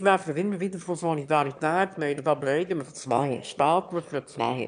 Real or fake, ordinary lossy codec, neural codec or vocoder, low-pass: fake; none; autoencoder, 22.05 kHz, a latent of 192 numbers a frame, VITS, trained on one speaker; 9.9 kHz